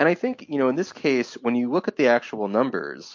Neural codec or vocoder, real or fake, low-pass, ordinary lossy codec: none; real; 7.2 kHz; MP3, 48 kbps